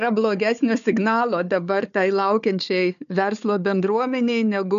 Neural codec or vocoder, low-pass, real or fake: codec, 16 kHz, 4 kbps, FunCodec, trained on Chinese and English, 50 frames a second; 7.2 kHz; fake